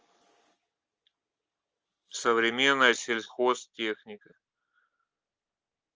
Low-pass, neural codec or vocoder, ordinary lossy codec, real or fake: 7.2 kHz; none; Opus, 24 kbps; real